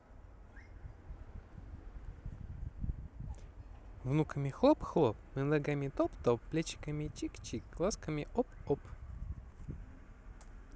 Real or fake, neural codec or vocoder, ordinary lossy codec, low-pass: real; none; none; none